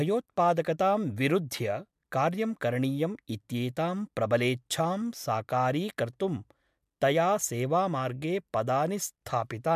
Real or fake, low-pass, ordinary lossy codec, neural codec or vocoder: real; 14.4 kHz; MP3, 96 kbps; none